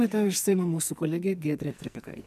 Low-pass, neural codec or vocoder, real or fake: 14.4 kHz; codec, 44.1 kHz, 2.6 kbps, SNAC; fake